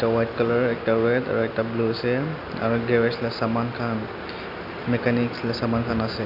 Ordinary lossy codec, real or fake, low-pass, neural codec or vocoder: none; real; 5.4 kHz; none